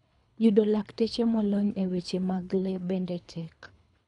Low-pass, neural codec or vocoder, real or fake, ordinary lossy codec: 10.8 kHz; codec, 24 kHz, 3 kbps, HILCodec; fake; none